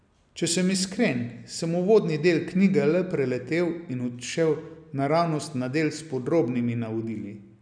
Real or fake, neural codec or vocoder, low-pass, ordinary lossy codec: real; none; 9.9 kHz; none